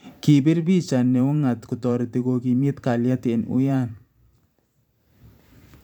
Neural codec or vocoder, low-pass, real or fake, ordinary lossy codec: vocoder, 44.1 kHz, 128 mel bands every 512 samples, BigVGAN v2; 19.8 kHz; fake; none